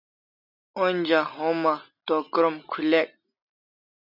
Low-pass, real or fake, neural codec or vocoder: 5.4 kHz; real; none